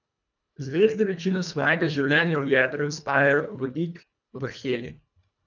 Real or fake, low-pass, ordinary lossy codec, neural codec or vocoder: fake; 7.2 kHz; none; codec, 24 kHz, 1.5 kbps, HILCodec